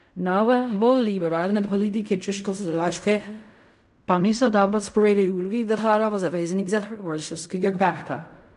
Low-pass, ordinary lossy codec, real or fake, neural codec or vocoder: 10.8 kHz; none; fake; codec, 16 kHz in and 24 kHz out, 0.4 kbps, LongCat-Audio-Codec, fine tuned four codebook decoder